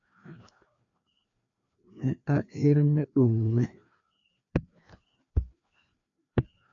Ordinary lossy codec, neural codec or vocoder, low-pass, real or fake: AAC, 64 kbps; codec, 16 kHz, 2 kbps, FreqCodec, larger model; 7.2 kHz; fake